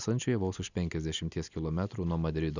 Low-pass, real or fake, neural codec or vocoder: 7.2 kHz; real; none